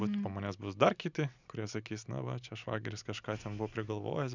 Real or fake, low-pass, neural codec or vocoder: real; 7.2 kHz; none